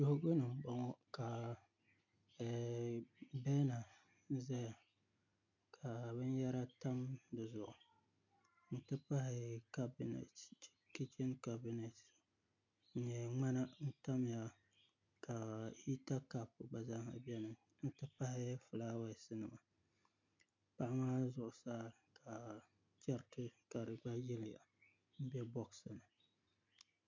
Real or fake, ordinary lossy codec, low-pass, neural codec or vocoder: real; MP3, 48 kbps; 7.2 kHz; none